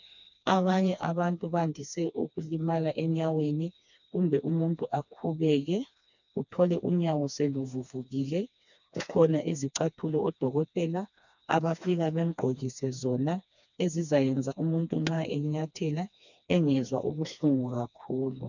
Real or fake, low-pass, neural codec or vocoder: fake; 7.2 kHz; codec, 16 kHz, 2 kbps, FreqCodec, smaller model